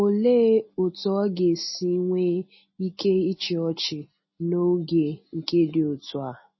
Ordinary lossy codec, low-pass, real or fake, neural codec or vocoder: MP3, 24 kbps; 7.2 kHz; real; none